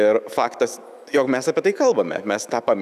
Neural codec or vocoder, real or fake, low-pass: autoencoder, 48 kHz, 128 numbers a frame, DAC-VAE, trained on Japanese speech; fake; 14.4 kHz